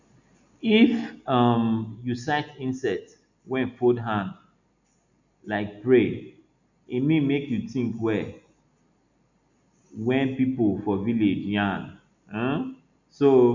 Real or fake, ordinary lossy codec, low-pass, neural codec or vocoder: real; none; 7.2 kHz; none